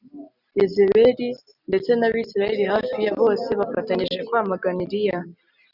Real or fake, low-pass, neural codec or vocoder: real; 5.4 kHz; none